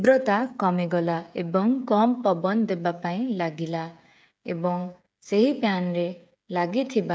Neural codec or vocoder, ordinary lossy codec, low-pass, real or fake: codec, 16 kHz, 16 kbps, FreqCodec, smaller model; none; none; fake